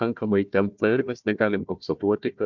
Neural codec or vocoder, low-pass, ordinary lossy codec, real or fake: codec, 16 kHz, 1 kbps, FunCodec, trained on Chinese and English, 50 frames a second; 7.2 kHz; MP3, 64 kbps; fake